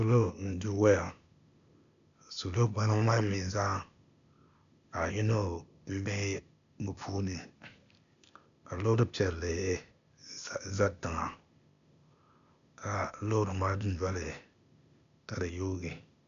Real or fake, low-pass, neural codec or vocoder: fake; 7.2 kHz; codec, 16 kHz, 0.8 kbps, ZipCodec